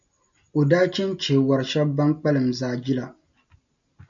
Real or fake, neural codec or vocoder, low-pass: real; none; 7.2 kHz